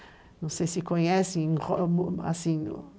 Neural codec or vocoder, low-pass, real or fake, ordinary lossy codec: none; none; real; none